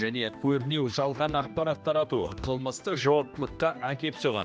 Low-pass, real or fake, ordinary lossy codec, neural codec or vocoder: none; fake; none; codec, 16 kHz, 1 kbps, X-Codec, HuBERT features, trained on general audio